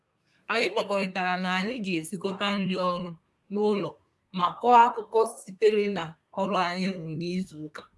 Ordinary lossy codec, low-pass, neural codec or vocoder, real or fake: none; none; codec, 24 kHz, 1 kbps, SNAC; fake